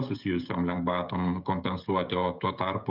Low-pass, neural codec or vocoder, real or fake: 5.4 kHz; codec, 16 kHz, 16 kbps, FreqCodec, smaller model; fake